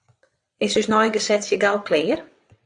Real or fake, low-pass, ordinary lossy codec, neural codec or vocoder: fake; 9.9 kHz; AAC, 64 kbps; vocoder, 22.05 kHz, 80 mel bands, WaveNeXt